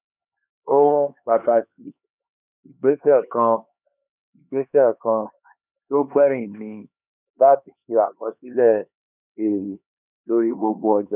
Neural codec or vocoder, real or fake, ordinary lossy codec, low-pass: codec, 16 kHz, 2 kbps, X-Codec, HuBERT features, trained on LibriSpeech; fake; none; 3.6 kHz